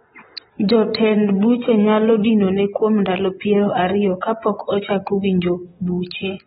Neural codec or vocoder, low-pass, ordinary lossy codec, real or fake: none; 7.2 kHz; AAC, 16 kbps; real